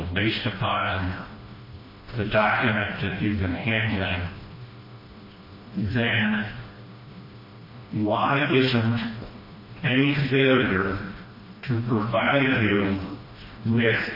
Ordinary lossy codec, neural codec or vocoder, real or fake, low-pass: MP3, 24 kbps; codec, 16 kHz, 1 kbps, FreqCodec, smaller model; fake; 5.4 kHz